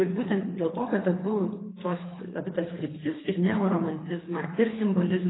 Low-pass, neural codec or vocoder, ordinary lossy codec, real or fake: 7.2 kHz; codec, 24 kHz, 3 kbps, HILCodec; AAC, 16 kbps; fake